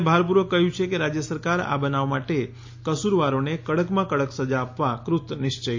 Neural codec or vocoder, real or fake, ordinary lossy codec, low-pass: none; real; MP3, 48 kbps; 7.2 kHz